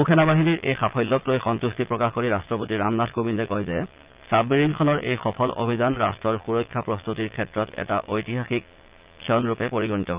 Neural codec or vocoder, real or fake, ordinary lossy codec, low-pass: vocoder, 22.05 kHz, 80 mel bands, Vocos; fake; Opus, 24 kbps; 3.6 kHz